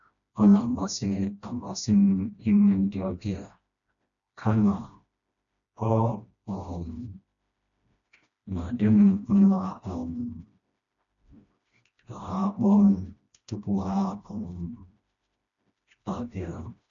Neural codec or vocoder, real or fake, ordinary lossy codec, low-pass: codec, 16 kHz, 1 kbps, FreqCodec, smaller model; fake; none; 7.2 kHz